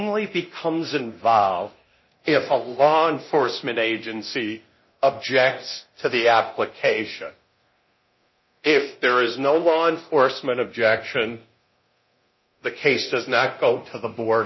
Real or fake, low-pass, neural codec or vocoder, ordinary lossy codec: fake; 7.2 kHz; codec, 24 kHz, 0.9 kbps, DualCodec; MP3, 24 kbps